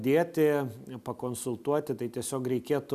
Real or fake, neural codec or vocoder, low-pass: real; none; 14.4 kHz